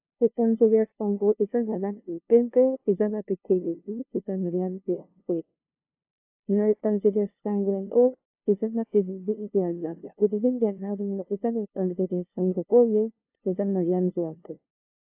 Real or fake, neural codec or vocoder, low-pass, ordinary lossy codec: fake; codec, 16 kHz, 0.5 kbps, FunCodec, trained on LibriTTS, 25 frames a second; 3.6 kHz; AAC, 32 kbps